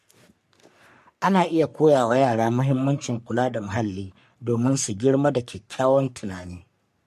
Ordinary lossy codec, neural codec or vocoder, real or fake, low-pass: MP3, 64 kbps; codec, 44.1 kHz, 3.4 kbps, Pupu-Codec; fake; 14.4 kHz